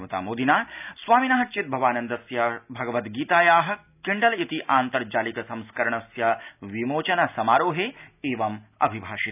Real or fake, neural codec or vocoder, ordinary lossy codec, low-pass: real; none; none; 3.6 kHz